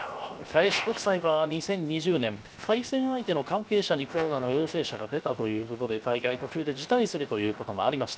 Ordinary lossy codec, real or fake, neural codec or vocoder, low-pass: none; fake; codec, 16 kHz, 0.7 kbps, FocalCodec; none